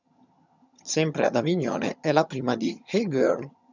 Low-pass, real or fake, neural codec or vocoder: 7.2 kHz; fake; vocoder, 22.05 kHz, 80 mel bands, HiFi-GAN